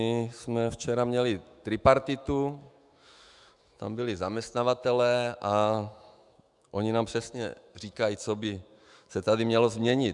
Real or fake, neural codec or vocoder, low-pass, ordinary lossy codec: fake; autoencoder, 48 kHz, 128 numbers a frame, DAC-VAE, trained on Japanese speech; 10.8 kHz; Opus, 64 kbps